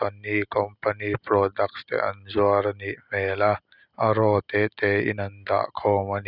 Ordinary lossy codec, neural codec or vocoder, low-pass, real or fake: none; none; 5.4 kHz; real